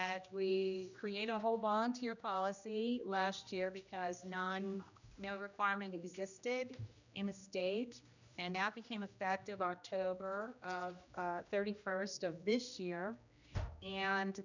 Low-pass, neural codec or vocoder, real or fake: 7.2 kHz; codec, 16 kHz, 1 kbps, X-Codec, HuBERT features, trained on general audio; fake